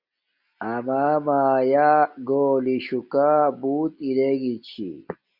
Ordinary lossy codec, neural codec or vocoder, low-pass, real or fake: Opus, 64 kbps; none; 5.4 kHz; real